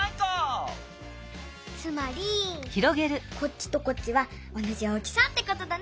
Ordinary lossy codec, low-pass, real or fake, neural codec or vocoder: none; none; real; none